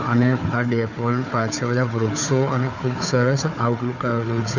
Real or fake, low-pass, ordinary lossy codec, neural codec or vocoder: fake; 7.2 kHz; none; codec, 16 kHz, 4 kbps, FunCodec, trained on Chinese and English, 50 frames a second